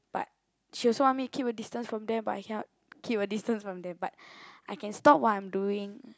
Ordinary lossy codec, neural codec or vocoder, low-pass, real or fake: none; none; none; real